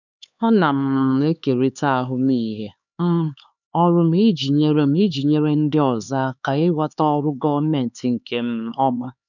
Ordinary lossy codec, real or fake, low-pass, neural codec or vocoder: none; fake; 7.2 kHz; codec, 16 kHz, 4 kbps, X-Codec, HuBERT features, trained on LibriSpeech